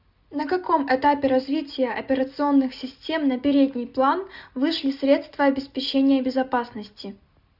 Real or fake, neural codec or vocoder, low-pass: real; none; 5.4 kHz